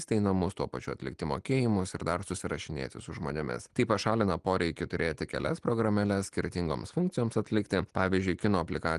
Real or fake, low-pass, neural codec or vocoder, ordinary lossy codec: real; 10.8 kHz; none; Opus, 24 kbps